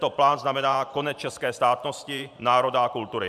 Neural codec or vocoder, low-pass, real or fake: vocoder, 44.1 kHz, 128 mel bands every 512 samples, BigVGAN v2; 14.4 kHz; fake